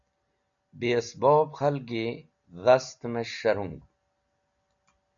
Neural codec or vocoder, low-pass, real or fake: none; 7.2 kHz; real